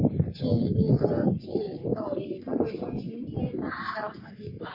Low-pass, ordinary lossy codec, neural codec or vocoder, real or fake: 5.4 kHz; MP3, 32 kbps; codec, 44.1 kHz, 3.4 kbps, Pupu-Codec; fake